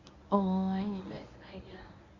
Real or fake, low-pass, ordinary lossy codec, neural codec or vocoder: fake; 7.2 kHz; none; codec, 24 kHz, 0.9 kbps, WavTokenizer, medium speech release version 1